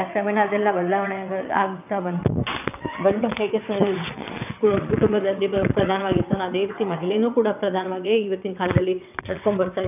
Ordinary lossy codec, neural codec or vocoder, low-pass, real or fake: none; vocoder, 22.05 kHz, 80 mel bands, WaveNeXt; 3.6 kHz; fake